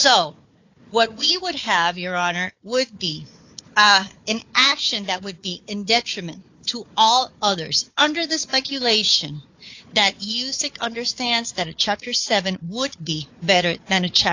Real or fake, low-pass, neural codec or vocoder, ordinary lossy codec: fake; 7.2 kHz; codec, 16 kHz, 4 kbps, FunCodec, trained on Chinese and English, 50 frames a second; AAC, 48 kbps